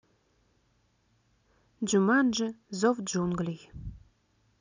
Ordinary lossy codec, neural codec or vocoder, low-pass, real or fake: none; none; 7.2 kHz; real